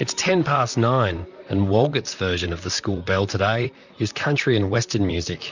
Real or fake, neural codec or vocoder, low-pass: fake; vocoder, 44.1 kHz, 128 mel bands, Pupu-Vocoder; 7.2 kHz